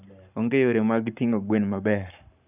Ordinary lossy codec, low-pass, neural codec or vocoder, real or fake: none; 3.6 kHz; codec, 44.1 kHz, 7.8 kbps, Pupu-Codec; fake